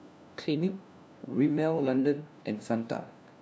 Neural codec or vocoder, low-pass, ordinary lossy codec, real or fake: codec, 16 kHz, 1 kbps, FunCodec, trained on LibriTTS, 50 frames a second; none; none; fake